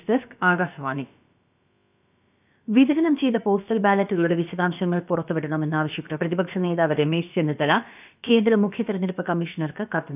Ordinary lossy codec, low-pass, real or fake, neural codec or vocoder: none; 3.6 kHz; fake; codec, 16 kHz, about 1 kbps, DyCAST, with the encoder's durations